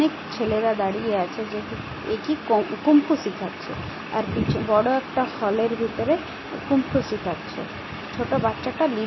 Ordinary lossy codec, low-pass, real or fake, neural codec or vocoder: MP3, 24 kbps; 7.2 kHz; real; none